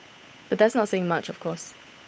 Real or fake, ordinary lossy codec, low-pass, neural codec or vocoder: fake; none; none; codec, 16 kHz, 8 kbps, FunCodec, trained on Chinese and English, 25 frames a second